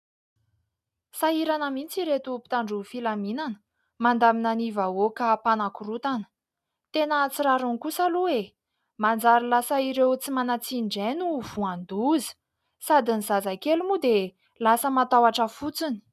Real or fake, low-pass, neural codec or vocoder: real; 14.4 kHz; none